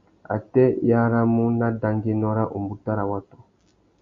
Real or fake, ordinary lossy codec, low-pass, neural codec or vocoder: real; Opus, 64 kbps; 7.2 kHz; none